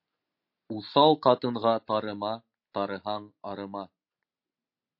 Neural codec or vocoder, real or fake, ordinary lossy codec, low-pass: none; real; MP3, 32 kbps; 5.4 kHz